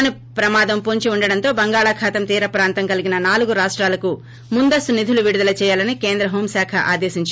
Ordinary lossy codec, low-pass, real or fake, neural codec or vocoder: none; none; real; none